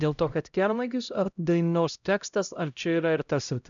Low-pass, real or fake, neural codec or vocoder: 7.2 kHz; fake; codec, 16 kHz, 0.5 kbps, X-Codec, HuBERT features, trained on LibriSpeech